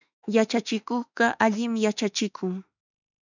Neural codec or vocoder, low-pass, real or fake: autoencoder, 48 kHz, 32 numbers a frame, DAC-VAE, trained on Japanese speech; 7.2 kHz; fake